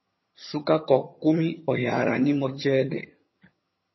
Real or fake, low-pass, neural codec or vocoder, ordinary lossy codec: fake; 7.2 kHz; vocoder, 22.05 kHz, 80 mel bands, HiFi-GAN; MP3, 24 kbps